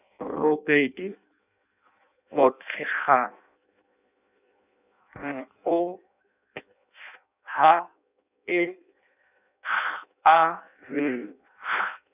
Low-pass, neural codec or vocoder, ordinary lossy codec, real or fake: 3.6 kHz; codec, 16 kHz in and 24 kHz out, 0.6 kbps, FireRedTTS-2 codec; none; fake